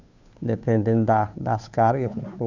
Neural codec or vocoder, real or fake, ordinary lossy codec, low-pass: codec, 16 kHz, 2 kbps, FunCodec, trained on Chinese and English, 25 frames a second; fake; none; 7.2 kHz